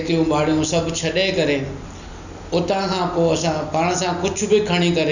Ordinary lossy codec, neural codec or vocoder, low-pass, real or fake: none; none; 7.2 kHz; real